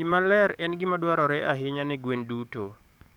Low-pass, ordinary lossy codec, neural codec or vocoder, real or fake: 19.8 kHz; none; codec, 44.1 kHz, 7.8 kbps, DAC; fake